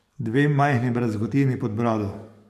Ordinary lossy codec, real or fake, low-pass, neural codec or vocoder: MP3, 64 kbps; fake; 14.4 kHz; codec, 44.1 kHz, 7.8 kbps, DAC